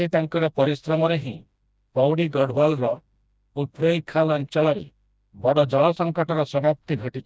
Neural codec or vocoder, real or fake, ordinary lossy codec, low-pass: codec, 16 kHz, 1 kbps, FreqCodec, smaller model; fake; none; none